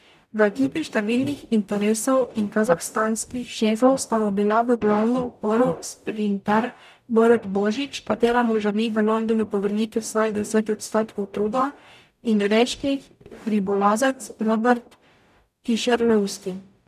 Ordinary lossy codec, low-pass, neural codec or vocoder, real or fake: none; 14.4 kHz; codec, 44.1 kHz, 0.9 kbps, DAC; fake